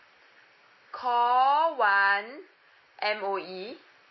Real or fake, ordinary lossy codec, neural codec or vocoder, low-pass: real; MP3, 24 kbps; none; 7.2 kHz